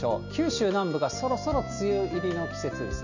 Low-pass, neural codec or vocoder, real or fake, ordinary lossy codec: 7.2 kHz; none; real; none